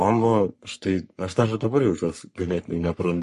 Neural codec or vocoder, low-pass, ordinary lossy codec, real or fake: codec, 44.1 kHz, 3.4 kbps, Pupu-Codec; 14.4 kHz; MP3, 48 kbps; fake